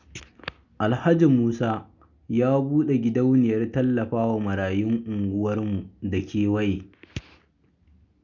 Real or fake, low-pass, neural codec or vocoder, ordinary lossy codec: real; 7.2 kHz; none; none